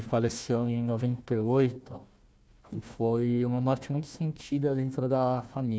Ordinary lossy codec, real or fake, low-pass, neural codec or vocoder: none; fake; none; codec, 16 kHz, 1 kbps, FunCodec, trained on Chinese and English, 50 frames a second